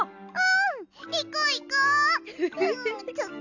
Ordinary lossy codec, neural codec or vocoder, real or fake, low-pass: none; none; real; 7.2 kHz